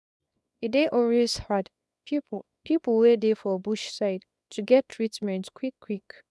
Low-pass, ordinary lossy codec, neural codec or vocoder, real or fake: none; none; codec, 24 kHz, 0.9 kbps, WavTokenizer, small release; fake